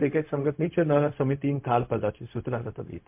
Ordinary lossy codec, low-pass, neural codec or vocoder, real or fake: MP3, 32 kbps; 3.6 kHz; codec, 16 kHz, 0.4 kbps, LongCat-Audio-Codec; fake